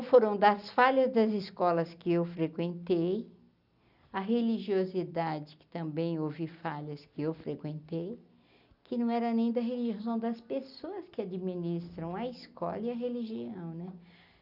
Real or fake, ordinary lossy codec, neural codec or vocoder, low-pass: real; none; none; 5.4 kHz